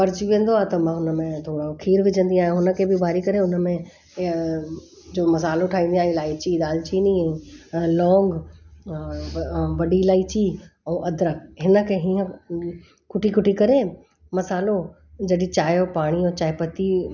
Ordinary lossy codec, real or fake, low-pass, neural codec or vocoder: none; real; 7.2 kHz; none